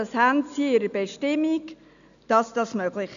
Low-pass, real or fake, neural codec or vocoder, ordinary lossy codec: 7.2 kHz; real; none; none